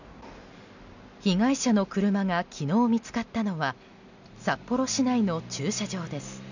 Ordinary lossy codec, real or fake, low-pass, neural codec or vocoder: none; real; 7.2 kHz; none